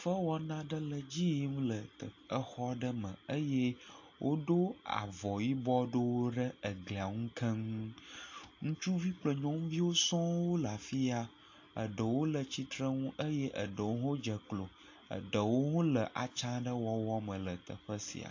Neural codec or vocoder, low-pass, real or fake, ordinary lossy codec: none; 7.2 kHz; real; AAC, 48 kbps